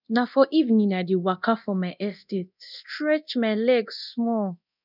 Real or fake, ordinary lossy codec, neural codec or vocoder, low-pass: fake; none; codec, 24 kHz, 0.9 kbps, DualCodec; 5.4 kHz